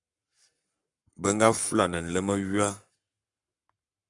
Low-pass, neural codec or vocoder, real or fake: 10.8 kHz; codec, 44.1 kHz, 7.8 kbps, Pupu-Codec; fake